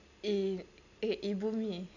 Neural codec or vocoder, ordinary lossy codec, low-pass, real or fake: none; none; 7.2 kHz; real